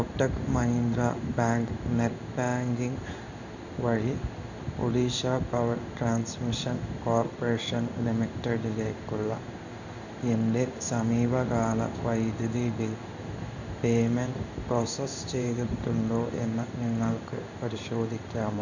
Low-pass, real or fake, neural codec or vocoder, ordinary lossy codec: 7.2 kHz; fake; codec, 16 kHz in and 24 kHz out, 1 kbps, XY-Tokenizer; none